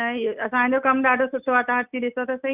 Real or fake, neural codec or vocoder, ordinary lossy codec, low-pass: real; none; Opus, 64 kbps; 3.6 kHz